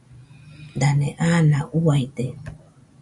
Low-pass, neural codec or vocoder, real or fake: 10.8 kHz; none; real